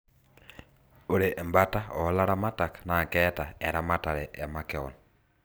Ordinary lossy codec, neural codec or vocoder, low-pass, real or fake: none; none; none; real